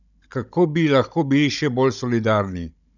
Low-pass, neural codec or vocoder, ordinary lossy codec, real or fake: 7.2 kHz; codec, 16 kHz, 16 kbps, FunCodec, trained on Chinese and English, 50 frames a second; none; fake